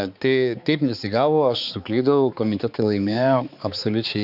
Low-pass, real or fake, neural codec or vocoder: 5.4 kHz; fake; codec, 16 kHz, 4 kbps, X-Codec, HuBERT features, trained on balanced general audio